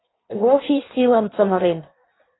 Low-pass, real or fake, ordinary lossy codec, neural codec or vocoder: 7.2 kHz; fake; AAC, 16 kbps; codec, 16 kHz in and 24 kHz out, 1.1 kbps, FireRedTTS-2 codec